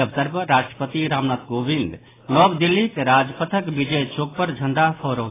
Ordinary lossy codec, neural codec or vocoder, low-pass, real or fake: AAC, 16 kbps; none; 3.6 kHz; real